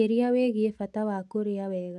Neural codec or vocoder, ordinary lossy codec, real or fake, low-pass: none; none; real; none